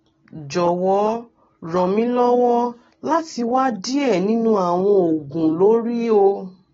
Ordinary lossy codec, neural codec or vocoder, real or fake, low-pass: AAC, 24 kbps; none; real; 7.2 kHz